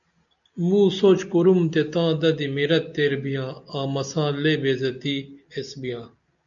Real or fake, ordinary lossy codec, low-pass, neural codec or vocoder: real; MP3, 96 kbps; 7.2 kHz; none